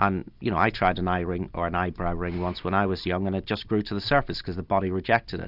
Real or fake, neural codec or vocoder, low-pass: real; none; 5.4 kHz